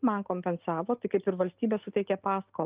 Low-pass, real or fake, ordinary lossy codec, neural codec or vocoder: 3.6 kHz; real; Opus, 24 kbps; none